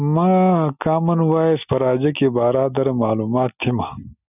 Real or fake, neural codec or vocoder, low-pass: real; none; 3.6 kHz